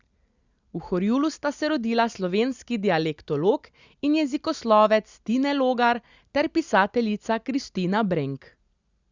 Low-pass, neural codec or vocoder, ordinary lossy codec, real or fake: 7.2 kHz; none; Opus, 64 kbps; real